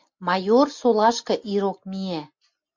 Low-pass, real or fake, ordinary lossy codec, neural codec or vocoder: 7.2 kHz; real; MP3, 48 kbps; none